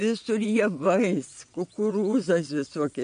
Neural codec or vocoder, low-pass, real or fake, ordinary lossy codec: vocoder, 22.05 kHz, 80 mel bands, WaveNeXt; 9.9 kHz; fake; MP3, 48 kbps